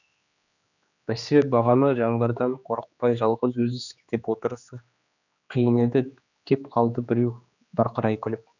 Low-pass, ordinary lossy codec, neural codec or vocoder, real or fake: 7.2 kHz; none; codec, 16 kHz, 2 kbps, X-Codec, HuBERT features, trained on general audio; fake